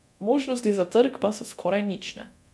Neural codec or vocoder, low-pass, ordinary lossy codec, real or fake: codec, 24 kHz, 0.9 kbps, DualCodec; none; none; fake